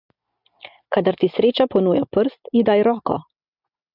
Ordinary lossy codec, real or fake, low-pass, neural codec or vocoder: AAC, 48 kbps; real; 5.4 kHz; none